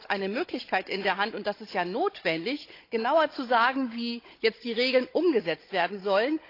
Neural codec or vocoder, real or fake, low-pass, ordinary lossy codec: codec, 16 kHz, 16 kbps, FunCodec, trained on Chinese and English, 50 frames a second; fake; 5.4 kHz; AAC, 32 kbps